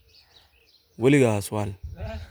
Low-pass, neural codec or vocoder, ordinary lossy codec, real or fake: none; none; none; real